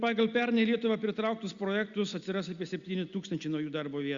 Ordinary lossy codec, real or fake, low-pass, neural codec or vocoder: Opus, 64 kbps; real; 7.2 kHz; none